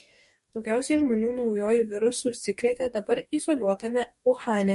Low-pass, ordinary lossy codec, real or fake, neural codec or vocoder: 14.4 kHz; MP3, 48 kbps; fake; codec, 44.1 kHz, 2.6 kbps, DAC